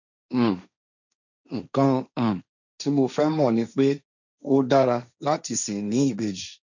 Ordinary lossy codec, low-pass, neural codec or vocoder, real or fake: none; 7.2 kHz; codec, 16 kHz, 1.1 kbps, Voila-Tokenizer; fake